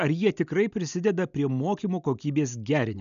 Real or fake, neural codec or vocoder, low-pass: real; none; 7.2 kHz